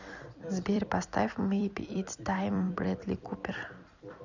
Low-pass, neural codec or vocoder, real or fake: 7.2 kHz; vocoder, 44.1 kHz, 128 mel bands every 256 samples, BigVGAN v2; fake